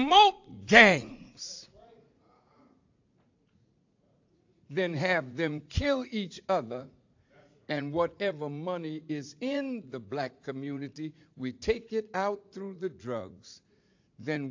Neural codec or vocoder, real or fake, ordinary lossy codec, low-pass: vocoder, 44.1 kHz, 80 mel bands, Vocos; fake; AAC, 48 kbps; 7.2 kHz